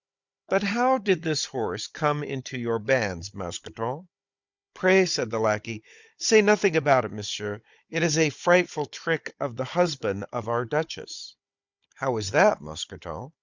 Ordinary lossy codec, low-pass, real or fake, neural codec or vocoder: Opus, 64 kbps; 7.2 kHz; fake; codec, 16 kHz, 16 kbps, FunCodec, trained on Chinese and English, 50 frames a second